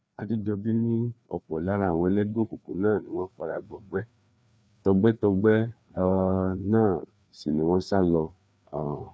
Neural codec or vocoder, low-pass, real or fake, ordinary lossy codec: codec, 16 kHz, 2 kbps, FreqCodec, larger model; none; fake; none